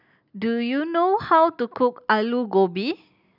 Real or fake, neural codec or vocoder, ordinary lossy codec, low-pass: real; none; none; 5.4 kHz